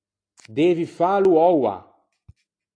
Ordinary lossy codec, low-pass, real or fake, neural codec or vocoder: AAC, 48 kbps; 9.9 kHz; real; none